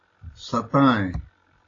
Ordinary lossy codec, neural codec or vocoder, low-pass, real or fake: AAC, 32 kbps; none; 7.2 kHz; real